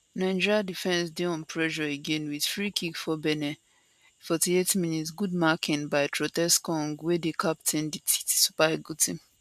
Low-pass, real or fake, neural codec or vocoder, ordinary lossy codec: 14.4 kHz; real; none; MP3, 96 kbps